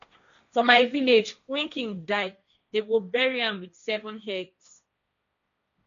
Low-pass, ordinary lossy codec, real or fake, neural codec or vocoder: 7.2 kHz; none; fake; codec, 16 kHz, 1.1 kbps, Voila-Tokenizer